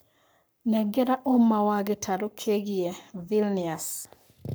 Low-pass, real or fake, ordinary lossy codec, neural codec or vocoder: none; fake; none; codec, 44.1 kHz, 7.8 kbps, Pupu-Codec